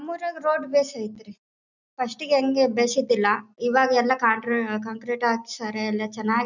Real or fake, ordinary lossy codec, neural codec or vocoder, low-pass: real; none; none; 7.2 kHz